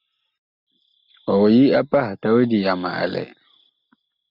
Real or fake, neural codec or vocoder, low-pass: real; none; 5.4 kHz